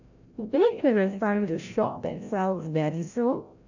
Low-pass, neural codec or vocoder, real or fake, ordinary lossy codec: 7.2 kHz; codec, 16 kHz, 0.5 kbps, FreqCodec, larger model; fake; none